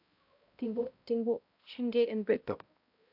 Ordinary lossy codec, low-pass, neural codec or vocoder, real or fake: AAC, 48 kbps; 5.4 kHz; codec, 16 kHz, 0.5 kbps, X-Codec, HuBERT features, trained on balanced general audio; fake